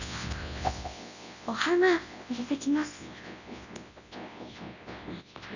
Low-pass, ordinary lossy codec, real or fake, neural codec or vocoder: 7.2 kHz; none; fake; codec, 24 kHz, 0.9 kbps, WavTokenizer, large speech release